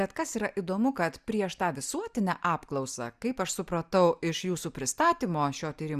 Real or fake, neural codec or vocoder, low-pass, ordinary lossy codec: real; none; 14.4 kHz; Opus, 64 kbps